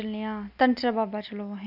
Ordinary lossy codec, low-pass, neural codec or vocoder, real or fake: AAC, 48 kbps; 5.4 kHz; none; real